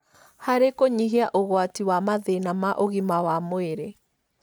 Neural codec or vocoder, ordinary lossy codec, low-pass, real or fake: none; none; none; real